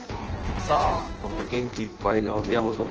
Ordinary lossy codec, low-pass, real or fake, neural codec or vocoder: Opus, 16 kbps; 7.2 kHz; fake; codec, 16 kHz in and 24 kHz out, 0.6 kbps, FireRedTTS-2 codec